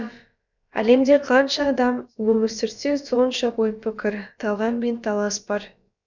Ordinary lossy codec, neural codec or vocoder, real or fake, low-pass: none; codec, 16 kHz, about 1 kbps, DyCAST, with the encoder's durations; fake; 7.2 kHz